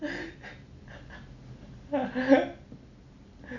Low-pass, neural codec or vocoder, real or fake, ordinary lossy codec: 7.2 kHz; none; real; none